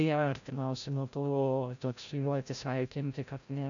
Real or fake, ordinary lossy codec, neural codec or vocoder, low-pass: fake; MP3, 96 kbps; codec, 16 kHz, 0.5 kbps, FreqCodec, larger model; 7.2 kHz